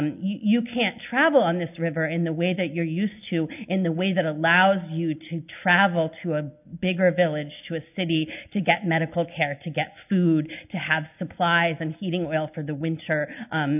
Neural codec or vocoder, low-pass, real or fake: none; 3.6 kHz; real